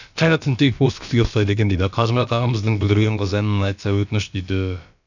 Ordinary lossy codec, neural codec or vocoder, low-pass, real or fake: none; codec, 16 kHz, about 1 kbps, DyCAST, with the encoder's durations; 7.2 kHz; fake